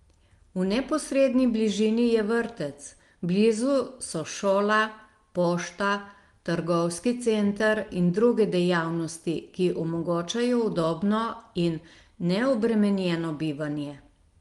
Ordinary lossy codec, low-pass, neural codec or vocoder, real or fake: Opus, 24 kbps; 10.8 kHz; none; real